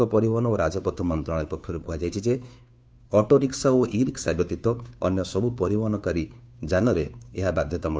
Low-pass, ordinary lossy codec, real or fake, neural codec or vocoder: none; none; fake; codec, 16 kHz, 2 kbps, FunCodec, trained on Chinese and English, 25 frames a second